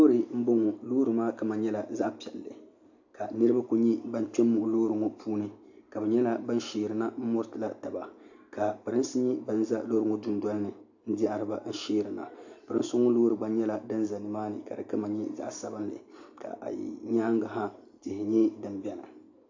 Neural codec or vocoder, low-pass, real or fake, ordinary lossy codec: none; 7.2 kHz; real; AAC, 48 kbps